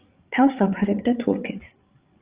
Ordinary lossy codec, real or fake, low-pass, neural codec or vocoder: Opus, 32 kbps; fake; 3.6 kHz; codec, 16 kHz, 8 kbps, FreqCodec, larger model